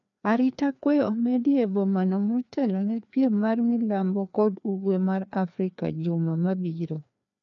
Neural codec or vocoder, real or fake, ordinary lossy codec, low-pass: codec, 16 kHz, 2 kbps, FreqCodec, larger model; fake; none; 7.2 kHz